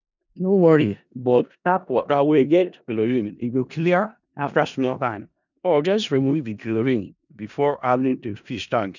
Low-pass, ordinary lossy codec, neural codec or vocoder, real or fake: 7.2 kHz; none; codec, 16 kHz in and 24 kHz out, 0.4 kbps, LongCat-Audio-Codec, four codebook decoder; fake